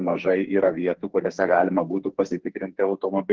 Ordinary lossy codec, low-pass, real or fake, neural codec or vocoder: Opus, 16 kbps; 7.2 kHz; fake; codec, 44.1 kHz, 2.6 kbps, SNAC